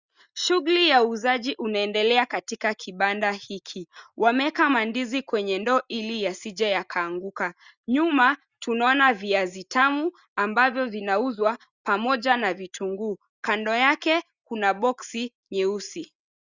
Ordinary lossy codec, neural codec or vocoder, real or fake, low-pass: Opus, 64 kbps; none; real; 7.2 kHz